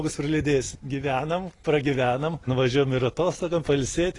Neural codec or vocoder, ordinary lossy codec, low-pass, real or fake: none; AAC, 32 kbps; 10.8 kHz; real